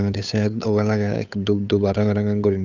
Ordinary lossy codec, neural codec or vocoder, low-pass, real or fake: none; codec, 16 kHz, 8 kbps, FunCodec, trained on Chinese and English, 25 frames a second; 7.2 kHz; fake